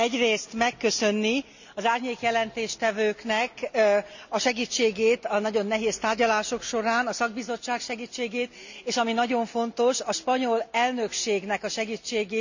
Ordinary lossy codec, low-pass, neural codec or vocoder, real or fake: none; 7.2 kHz; none; real